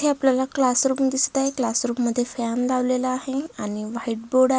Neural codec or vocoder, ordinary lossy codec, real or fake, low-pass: none; none; real; none